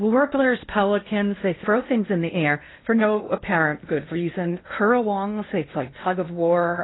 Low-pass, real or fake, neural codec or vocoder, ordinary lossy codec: 7.2 kHz; fake; codec, 16 kHz in and 24 kHz out, 0.8 kbps, FocalCodec, streaming, 65536 codes; AAC, 16 kbps